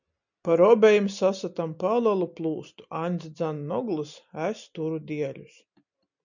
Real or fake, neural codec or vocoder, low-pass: real; none; 7.2 kHz